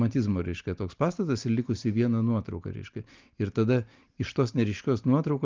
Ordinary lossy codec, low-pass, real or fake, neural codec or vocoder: Opus, 32 kbps; 7.2 kHz; real; none